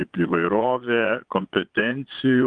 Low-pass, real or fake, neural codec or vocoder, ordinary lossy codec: 9.9 kHz; fake; vocoder, 22.05 kHz, 80 mel bands, Vocos; Opus, 32 kbps